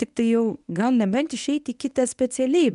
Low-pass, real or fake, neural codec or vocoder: 10.8 kHz; fake; codec, 24 kHz, 0.9 kbps, WavTokenizer, medium speech release version 1